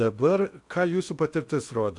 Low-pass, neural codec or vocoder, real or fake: 10.8 kHz; codec, 16 kHz in and 24 kHz out, 0.8 kbps, FocalCodec, streaming, 65536 codes; fake